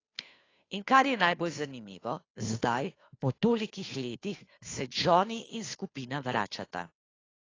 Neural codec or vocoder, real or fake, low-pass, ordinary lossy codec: codec, 16 kHz, 2 kbps, FunCodec, trained on Chinese and English, 25 frames a second; fake; 7.2 kHz; AAC, 32 kbps